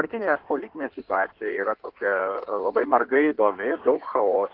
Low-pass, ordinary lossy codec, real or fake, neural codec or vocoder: 5.4 kHz; Opus, 16 kbps; fake; codec, 16 kHz in and 24 kHz out, 1.1 kbps, FireRedTTS-2 codec